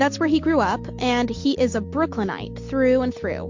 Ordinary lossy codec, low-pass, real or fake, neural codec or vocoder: MP3, 48 kbps; 7.2 kHz; real; none